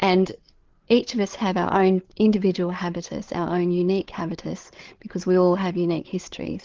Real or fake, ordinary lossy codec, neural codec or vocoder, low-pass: fake; Opus, 16 kbps; codec, 16 kHz, 8 kbps, FreqCodec, larger model; 7.2 kHz